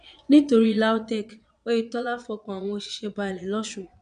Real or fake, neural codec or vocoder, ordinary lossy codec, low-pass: fake; vocoder, 22.05 kHz, 80 mel bands, Vocos; none; 9.9 kHz